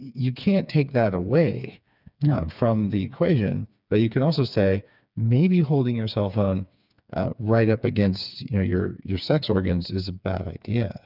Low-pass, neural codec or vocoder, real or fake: 5.4 kHz; codec, 16 kHz, 4 kbps, FreqCodec, smaller model; fake